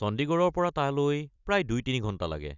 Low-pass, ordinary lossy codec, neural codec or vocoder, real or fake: 7.2 kHz; none; none; real